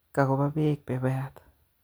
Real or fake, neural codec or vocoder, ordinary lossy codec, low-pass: real; none; none; none